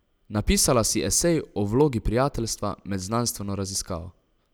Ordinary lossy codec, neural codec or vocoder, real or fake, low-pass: none; none; real; none